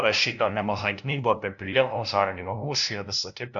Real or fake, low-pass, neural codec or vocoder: fake; 7.2 kHz; codec, 16 kHz, 0.5 kbps, FunCodec, trained on LibriTTS, 25 frames a second